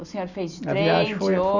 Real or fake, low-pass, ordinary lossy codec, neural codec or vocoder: real; 7.2 kHz; none; none